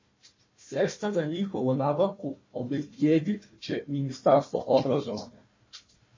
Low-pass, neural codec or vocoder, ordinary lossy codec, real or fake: 7.2 kHz; codec, 16 kHz, 1 kbps, FunCodec, trained on Chinese and English, 50 frames a second; MP3, 32 kbps; fake